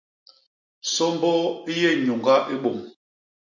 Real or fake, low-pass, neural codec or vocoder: real; 7.2 kHz; none